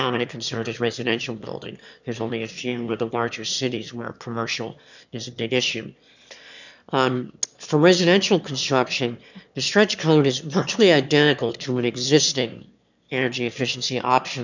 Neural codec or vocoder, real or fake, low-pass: autoencoder, 22.05 kHz, a latent of 192 numbers a frame, VITS, trained on one speaker; fake; 7.2 kHz